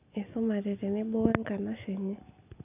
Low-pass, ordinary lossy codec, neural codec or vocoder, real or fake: 3.6 kHz; none; none; real